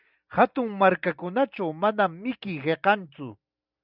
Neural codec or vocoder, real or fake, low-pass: none; real; 5.4 kHz